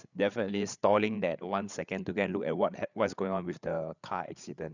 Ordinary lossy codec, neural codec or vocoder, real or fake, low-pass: none; codec, 16 kHz, 8 kbps, FreqCodec, larger model; fake; 7.2 kHz